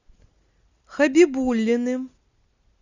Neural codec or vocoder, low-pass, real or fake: none; 7.2 kHz; real